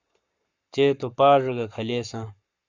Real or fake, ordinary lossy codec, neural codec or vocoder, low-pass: fake; Opus, 64 kbps; codec, 44.1 kHz, 7.8 kbps, Pupu-Codec; 7.2 kHz